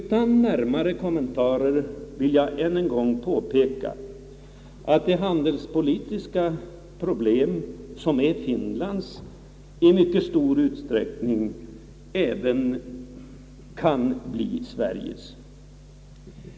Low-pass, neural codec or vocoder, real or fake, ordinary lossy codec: none; none; real; none